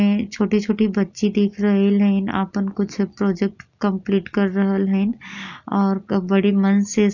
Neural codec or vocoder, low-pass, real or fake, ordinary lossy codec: none; 7.2 kHz; real; none